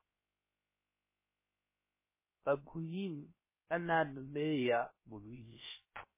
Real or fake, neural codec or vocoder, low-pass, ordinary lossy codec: fake; codec, 16 kHz, 0.3 kbps, FocalCodec; 3.6 kHz; MP3, 16 kbps